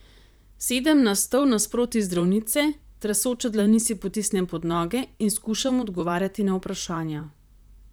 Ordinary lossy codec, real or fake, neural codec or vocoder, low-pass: none; fake; vocoder, 44.1 kHz, 128 mel bands, Pupu-Vocoder; none